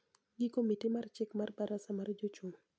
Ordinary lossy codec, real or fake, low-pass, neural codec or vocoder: none; real; none; none